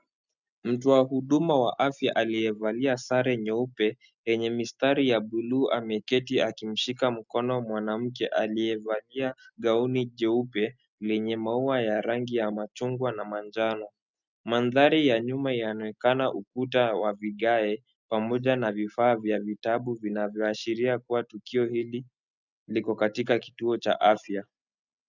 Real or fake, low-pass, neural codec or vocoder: real; 7.2 kHz; none